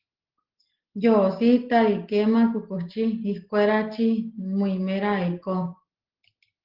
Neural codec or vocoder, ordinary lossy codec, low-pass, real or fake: none; Opus, 16 kbps; 5.4 kHz; real